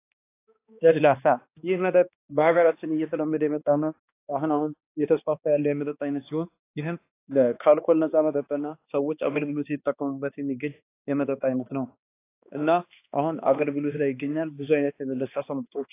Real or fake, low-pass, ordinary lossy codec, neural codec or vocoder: fake; 3.6 kHz; AAC, 24 kbps; codec, 16 kHz, 2 kbps, X-Codec, HuBERT features, trained on balanced general audio